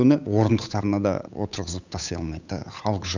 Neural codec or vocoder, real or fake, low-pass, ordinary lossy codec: codec, 16 kHz, 8 kbps, FunCodec, trained on Chinese and English, 25 frames a second; fake; 7.2 kHz; none